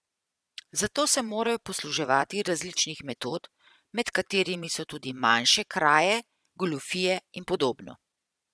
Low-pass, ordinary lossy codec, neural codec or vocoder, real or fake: none; none; vocoder, 22.05 kHz, 80 mel bands, Vocos; fake